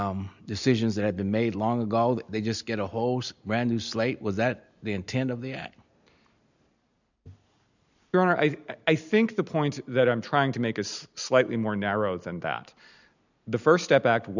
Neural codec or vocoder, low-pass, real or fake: none; 7.2 kHz; real